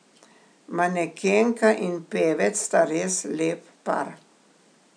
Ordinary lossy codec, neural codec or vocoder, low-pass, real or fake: none; none; 9.9 kHz; real